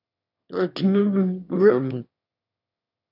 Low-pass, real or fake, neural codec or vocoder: 5.4 kHz; fake; autoencoder, 22.05 kHz, a latent of 192 numbers a frame, VITS, trained on one speaker